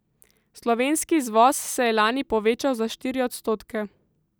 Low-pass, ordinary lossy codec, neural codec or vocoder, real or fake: none; none; none; real